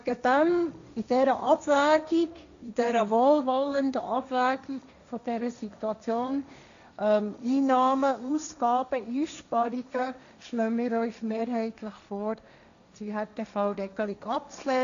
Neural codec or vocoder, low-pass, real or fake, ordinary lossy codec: codec, 16 kHz, 1.1 kbps, Voila-Tokenizer; 7.2 kHz; fake; AAC, 96 kbps